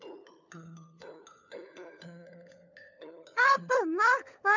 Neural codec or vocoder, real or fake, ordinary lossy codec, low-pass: codec, 16 kHz, 4 kbps, FunCodec, trained on LibriTTS, 50 frames a second; fake; none; 7.2 kHz